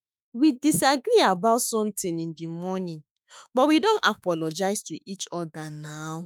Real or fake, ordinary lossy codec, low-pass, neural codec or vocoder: fake; none; 19.8 kHz; autoencoder, 48 kHz, 32 numbers a frame, DAC-VAE, trained on Japanese speech